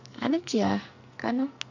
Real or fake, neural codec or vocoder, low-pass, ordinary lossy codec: fake; codec, 44.1 kHz, 2.6 kbps, SNAC; 7.2 kHz; none